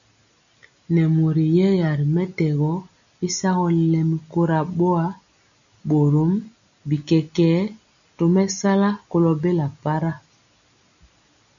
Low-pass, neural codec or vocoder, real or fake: 7.2 kHz; none; real